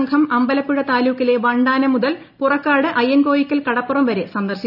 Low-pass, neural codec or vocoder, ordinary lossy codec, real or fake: 5.4 kHz; none; none; real